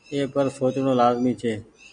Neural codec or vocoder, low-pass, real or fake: none; 9.9 kHz; real